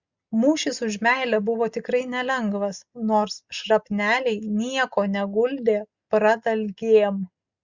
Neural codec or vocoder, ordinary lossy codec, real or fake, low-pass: none; Opus, 64 kbps; real; 7.2 kHz